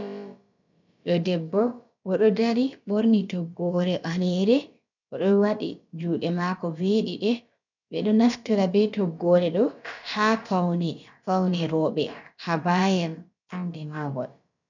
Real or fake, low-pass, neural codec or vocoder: fake; 7.2 kHz; codec, 16 kHz, about 1 kbps, DyCAST, with the encoder's durations